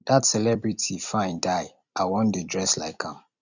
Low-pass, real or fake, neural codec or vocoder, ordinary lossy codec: 7.2 kHz; fake; vocoder, 44.1 kHz, 128 mel bands every 256 samples, BigVGAN v2; none